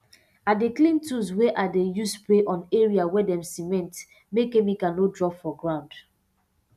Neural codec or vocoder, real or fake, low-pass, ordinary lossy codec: none; real; 14.4 kHz; none